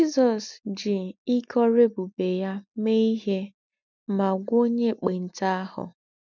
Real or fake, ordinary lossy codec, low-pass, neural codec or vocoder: real; none; 7.2 kHz; none